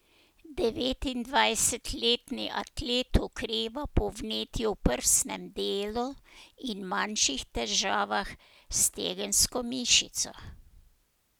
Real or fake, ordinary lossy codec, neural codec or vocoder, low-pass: real; none; none; none